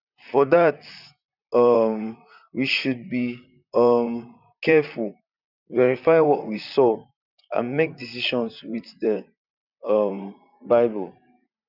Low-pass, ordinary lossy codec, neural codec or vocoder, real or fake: 5.4 kHz; none; vocoder, 44.1 kHz, 128 mel bands, Pupu-Vocoder; fake